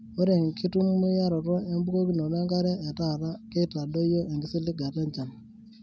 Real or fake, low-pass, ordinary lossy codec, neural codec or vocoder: real; none; none; none